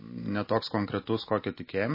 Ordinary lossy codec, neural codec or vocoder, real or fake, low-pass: MP3, 24 kbps; none; real; 5.4 kHz